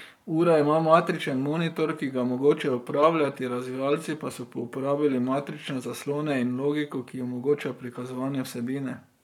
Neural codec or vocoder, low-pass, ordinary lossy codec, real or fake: codec, 44.1 kHz, 7.8 kbps, Pupu-Codec; 19.8 kHz; none; fake